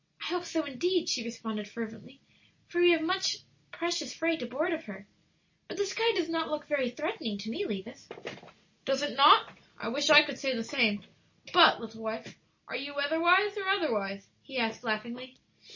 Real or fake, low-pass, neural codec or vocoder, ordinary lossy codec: real; 7.2 kHz; none; MP3, 32 kbps